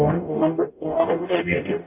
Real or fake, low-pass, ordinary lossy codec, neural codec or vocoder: fake; 3.6 kHz; none; codec, 44.1 kHz, 0.9 kbps, DAC